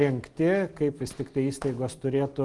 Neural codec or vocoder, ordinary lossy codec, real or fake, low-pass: none; Opus, 24 kbps; real; 10.8 kHz